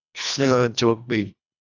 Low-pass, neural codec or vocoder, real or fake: 7.2 kHz; codec, 24 kHz, 1.5 kbps, HILCodec; fake